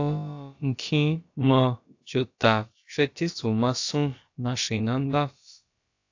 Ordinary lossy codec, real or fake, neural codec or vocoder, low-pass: AAC, 48 kbps; fake; codec, 16 kHz, about 1 kbps, DyCAST, with the encoder's durations; 7.2 kHz